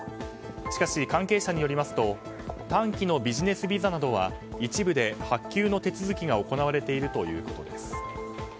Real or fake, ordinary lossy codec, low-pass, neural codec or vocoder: real; none; none; none